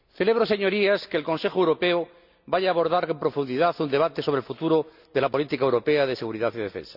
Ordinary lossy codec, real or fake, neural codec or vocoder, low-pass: none; real; none; 5.4 kHz